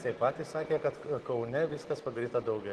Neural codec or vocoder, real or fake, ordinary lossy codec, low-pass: none; real; Opus, 16 kbps; 10.8 kHz